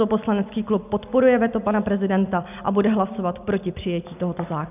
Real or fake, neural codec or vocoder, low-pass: real; none; 3.6 kHz